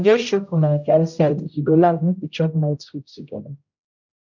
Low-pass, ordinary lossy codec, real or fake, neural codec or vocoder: 7.2 kHz; none; fake; codec, 16 kHz, 0.5 kbps, X-Codec, HuBERT features, trained on general audio